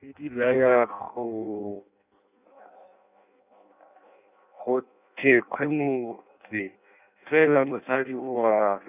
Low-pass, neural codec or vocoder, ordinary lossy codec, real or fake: 3.6 kHz; codec, 16 kHz in and 24 kHz out, 0.6 kbps, FireRedTTS-2 codec; none; fake